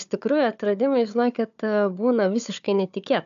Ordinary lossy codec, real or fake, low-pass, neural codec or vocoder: MP3, 96 kbps; fake; 7.2 kHz; codec, 16 kHz, 4 kbps, FunCodec, trained on Chinese and English, 50 frames a second